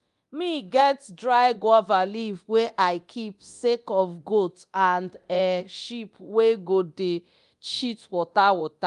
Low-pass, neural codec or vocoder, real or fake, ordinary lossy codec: 10.8 kHz; codec, 24 kHz, 0.9 kbps, DualCodec; fake; Opus, 32 kbps